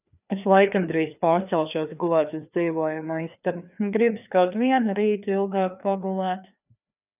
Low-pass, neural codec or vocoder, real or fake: 3.6 kHz; codec, 16 kHz, 2 kbps, FreqCodec, larger model; fake